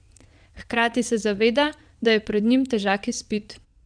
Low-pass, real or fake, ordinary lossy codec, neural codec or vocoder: 9.9 kHz; fake; none; vocoder, 22.05 kHz, 80 mel bands, Vocos